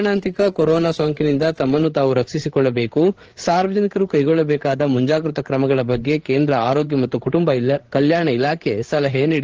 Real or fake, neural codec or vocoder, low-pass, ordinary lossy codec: fake; codec, 16 kHz, 8 kbps, FreqCodec, larger model; 7.2 kHz; Opus, 16 kbps